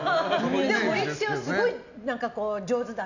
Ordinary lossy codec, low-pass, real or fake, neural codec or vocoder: none; 7.2 kHz; real; none